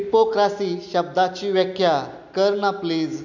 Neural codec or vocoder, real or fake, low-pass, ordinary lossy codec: none; real; 7.2 kHz; none